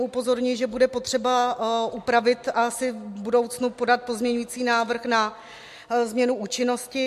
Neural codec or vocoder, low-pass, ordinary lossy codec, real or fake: none; 14.4 kHz; MP3, 64 kbps; real